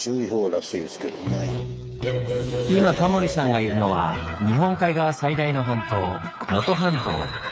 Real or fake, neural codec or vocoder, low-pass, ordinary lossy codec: fake; codec, 16 kHz, 4 kbps, FreqCodec, smaller model; none; none